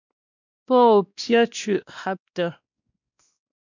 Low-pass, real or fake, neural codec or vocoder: 7.2 kHz; fake; codec, 16 kHz, 1 kbps, X-Codec, WavLM features, trained on Multilingual LibriSpeech